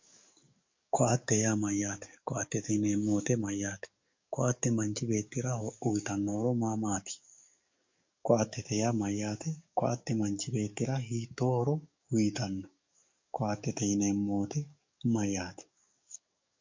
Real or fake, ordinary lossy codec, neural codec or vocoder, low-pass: fake; MP3, 48 kbps; codec, 44.1 kHz, 7.8 kbps, DAC; 7.2 kHz